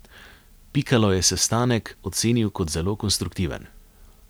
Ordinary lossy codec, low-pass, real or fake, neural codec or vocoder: none; none; real; none